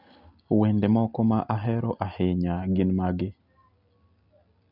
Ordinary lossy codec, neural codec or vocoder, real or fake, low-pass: none; none; real; 5.4 kHz